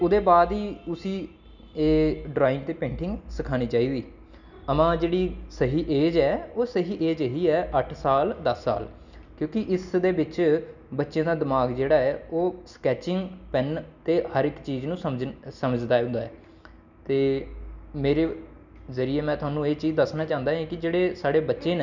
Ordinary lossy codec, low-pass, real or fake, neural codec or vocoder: none; 7.2 kHz; real; none